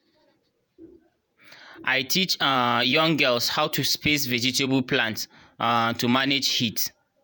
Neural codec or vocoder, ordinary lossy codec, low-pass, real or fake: vocoder, 48 kHz, 128 mel bands, Vocos; none; none; fake